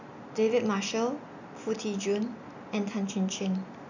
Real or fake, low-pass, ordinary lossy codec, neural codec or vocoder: real; 7.2 kHz; none; none